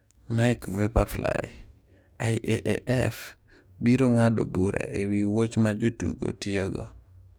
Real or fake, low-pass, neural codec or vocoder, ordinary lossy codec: fake; none; codec, 44.1 kHz, 2.6 kbps, DAC; none